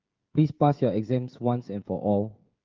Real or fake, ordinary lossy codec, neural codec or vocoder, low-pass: fake; Opus, 32 kbps; codec, 16 kHz, 16 kbps, FreqCodec, smaller model; 7.2 kHz